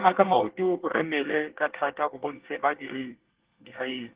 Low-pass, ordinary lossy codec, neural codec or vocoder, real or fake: 3.6 kHz; Opus, 16 kbps; codec, 24 kHz, 1 kbps, SNAC; fake